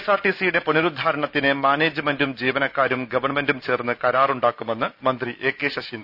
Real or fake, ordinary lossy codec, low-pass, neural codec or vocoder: real; none; 5.4 kHz; none